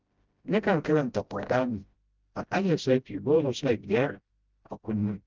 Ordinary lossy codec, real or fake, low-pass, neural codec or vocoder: Opus, 24 kbps; fake; 7.2 kHz; codec, 16 kHz, 0.5 kbps, FreqCodec, smaller model